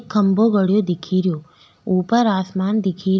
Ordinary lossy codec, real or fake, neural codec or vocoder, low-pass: none; real; none; none